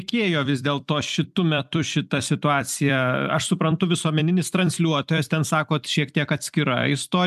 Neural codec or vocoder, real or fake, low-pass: vocoder, 44.1 kHz, 128 mel bands every 256 samples, BigVGAN v2; fake; 14.4 kHz